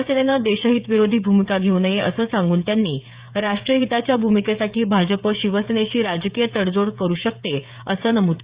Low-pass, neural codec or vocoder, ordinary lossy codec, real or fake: 3.6 kHz; codec, 16 kHz, 16 kbps, FreqCodec, smaller model; Opus, 64 kbps; fake